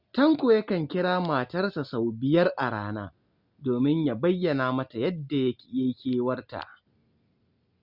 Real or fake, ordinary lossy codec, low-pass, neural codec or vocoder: real; Opus, 64 kbps; 5.4 kHz; none